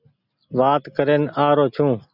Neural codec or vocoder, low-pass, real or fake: none; 5.4 kHz; real